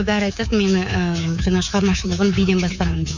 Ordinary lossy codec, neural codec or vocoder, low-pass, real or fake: none; codec, 24 kHz, 3.1 kbps, DualCodec; 7.2 kHz; fake